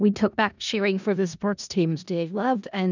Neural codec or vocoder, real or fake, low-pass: codec, 16 kHz in and 24 kHz out, 0.4 kbps, LongCat-Audio-Codec, four codebook decoder; fake; 7.2 kHz